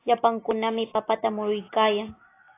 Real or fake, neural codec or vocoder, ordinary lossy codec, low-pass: real; none; AAC, 16 kbps; 3.6 kHz